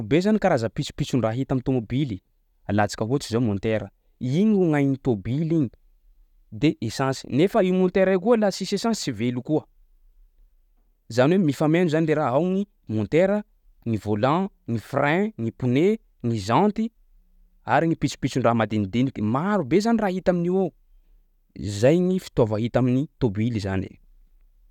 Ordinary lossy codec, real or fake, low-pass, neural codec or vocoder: none; real; 19.8 kHz; none